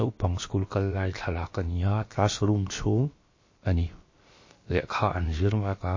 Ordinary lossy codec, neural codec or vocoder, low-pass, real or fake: MP3, 32 kbps; codec, 16 kHz, about 1 kbps, DyCAST, with the encoder's durations; 7.2 kHz; fake